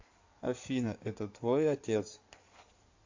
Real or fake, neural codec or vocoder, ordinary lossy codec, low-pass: fake; codec, 16 kHz in and 24 kHz out, 2.2 kbps, FireRedTTS-2 codec; AAC, 48 kbps; 7.2 kHz